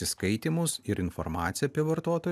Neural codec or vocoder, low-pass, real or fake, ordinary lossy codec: none; 14.4 kHz; real; AAC, 96 kbps